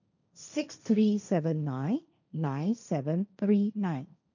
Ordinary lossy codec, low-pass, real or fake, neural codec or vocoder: none; 7.2 kHz; fake; codec, 16 kHz, 1.1 kbps, Voila-Tokenizer